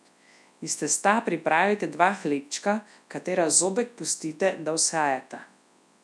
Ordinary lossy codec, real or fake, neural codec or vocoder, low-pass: none; fake; codec, 24 kHz, 0.9 kbps, WavTokenizer, large speech release; none